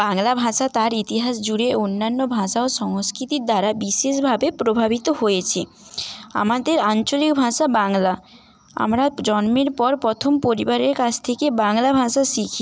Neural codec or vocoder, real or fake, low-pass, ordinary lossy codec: none; real; none; none